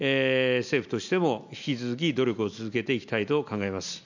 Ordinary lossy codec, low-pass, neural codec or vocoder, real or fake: none; 7.2 kHz; none; real